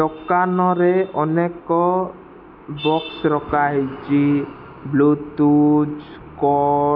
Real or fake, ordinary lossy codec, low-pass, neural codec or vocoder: real; none; 5.4 kHz; none